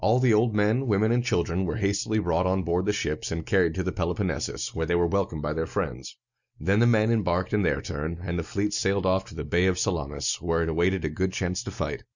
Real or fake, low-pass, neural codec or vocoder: real; 7.2 kHz; none